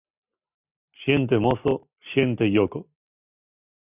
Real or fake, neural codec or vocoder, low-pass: real; none; 3.6 kHz